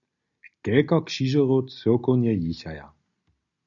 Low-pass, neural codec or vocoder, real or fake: 7.2 kHz; none; real